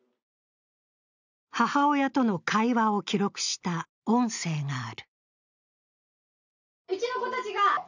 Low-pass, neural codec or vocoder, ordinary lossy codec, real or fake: 7.2 kHz; none; none; real